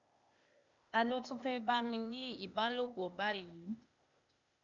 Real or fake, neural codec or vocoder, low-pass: fake; codec, 16 kHz, 0.8 kbps, ZipCodec; 7.2 kHz